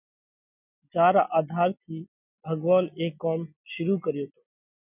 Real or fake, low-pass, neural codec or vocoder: real; 3.6 kHz; none